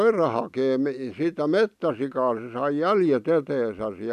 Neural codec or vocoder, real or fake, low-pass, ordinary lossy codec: none; real; 14.4 kHz; none